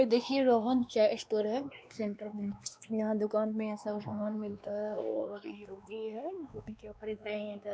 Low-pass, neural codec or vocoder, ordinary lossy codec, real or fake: none; codec, 16 kHz, 2 kbps, X-Codec, WavLM features, trained on Multilingual LibriSpeech; none; fake